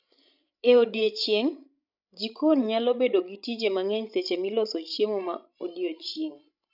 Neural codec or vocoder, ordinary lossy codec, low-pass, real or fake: codec, 16 kHz, 16 kbps, FreqCodec, larger model; none; 5.4 kHz; fake